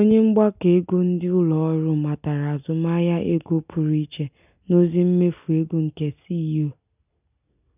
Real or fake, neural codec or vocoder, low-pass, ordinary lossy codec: real; none; 3.6 kHz; none